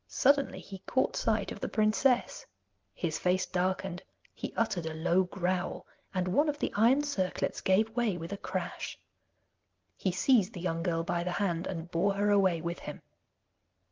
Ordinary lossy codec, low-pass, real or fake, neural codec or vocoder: Opus, 32 kbps; 7.2 kHz; real; none